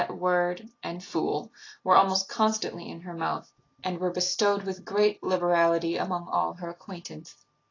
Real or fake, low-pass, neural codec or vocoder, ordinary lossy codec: real; 7.2 kHz; none; AAC, 32 kbps